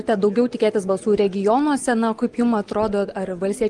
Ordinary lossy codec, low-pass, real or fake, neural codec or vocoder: Opus, 24 kbps; 10.8 kHz; real; none